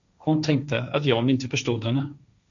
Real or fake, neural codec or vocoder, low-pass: fake; codec, 16 kHz, 1.1 kbps, Voila-Tokenizer; 7.2 kHz